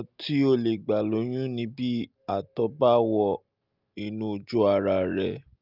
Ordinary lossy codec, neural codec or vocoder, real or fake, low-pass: Opus, 32 kbps; none; real; 5.4 kHz